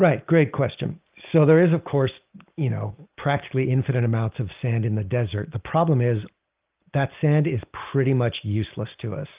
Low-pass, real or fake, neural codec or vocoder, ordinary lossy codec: 3.6 kHz; real; none; Opus, 32 kbps